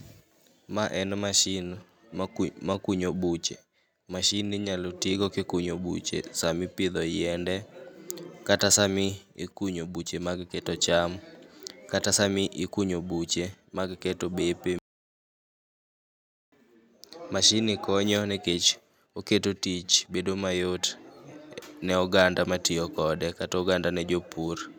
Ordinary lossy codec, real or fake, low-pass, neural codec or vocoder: none; real; none; none